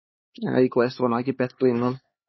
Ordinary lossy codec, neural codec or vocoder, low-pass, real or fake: MP3, 24 kbps; codec, 16 kHz, 4 kbps, X-Codec, HuBERT features, trained on LibriSpeech; 7.2 kHz; fake